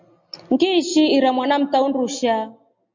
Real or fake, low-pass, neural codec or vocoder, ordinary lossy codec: real; 7.2 kHz; none; MP3, 48 kbps